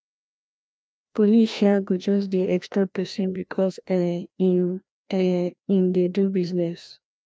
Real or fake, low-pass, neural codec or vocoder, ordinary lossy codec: fake; none; codec, 16 kHz, 1 kbps, FreqCodec, larger model; none